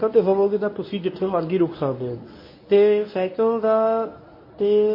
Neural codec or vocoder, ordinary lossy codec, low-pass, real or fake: codec, 24 kHz, 0.9 kbps, WavTokenizer, medium speech release version 1; MP3, 24 kbps; 5.4 kHz; fake